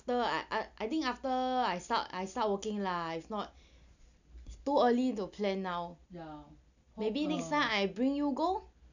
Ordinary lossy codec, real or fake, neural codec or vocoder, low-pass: none; real; none; 7.2 kHz